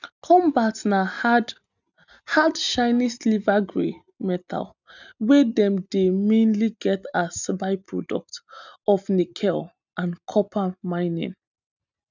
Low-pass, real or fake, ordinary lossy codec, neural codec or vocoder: 7.2 kHz; real; none; none